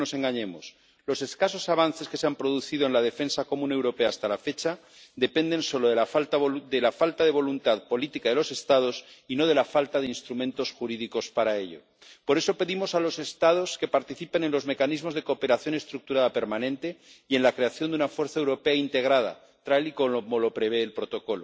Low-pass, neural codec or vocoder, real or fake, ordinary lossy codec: none; none; real; none